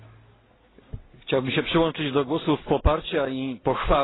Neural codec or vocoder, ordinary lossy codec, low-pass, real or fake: none; AAC, 16 kbps; 7.2 kHz; real